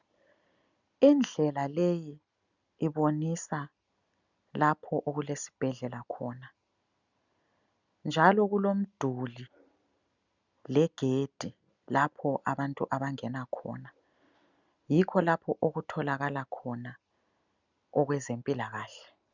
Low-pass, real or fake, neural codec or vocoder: 7.2 kHz; real; none